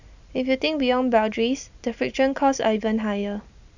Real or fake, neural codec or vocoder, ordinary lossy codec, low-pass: real; none; none; 7.2 kHz